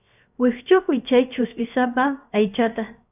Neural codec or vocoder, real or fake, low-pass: codec, 16 kHz, 0.7 kbps, FocalCodec; fake; 3.6 kHz